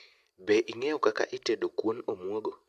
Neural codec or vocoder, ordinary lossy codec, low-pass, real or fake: none; AAC, 96 kbps; 14.4 kHz; real